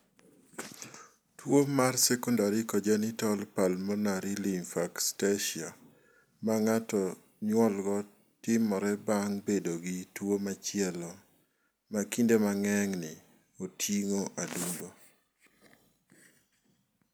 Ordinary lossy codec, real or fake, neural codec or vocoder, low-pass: none; real; none; none